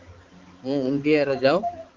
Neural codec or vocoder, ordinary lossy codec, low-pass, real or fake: codec, 16 kHz, 4 kbps, X-Codec, HuBERT features, trained on balanced general audio; Opus, 24 kbps; 7.2 kHz; fake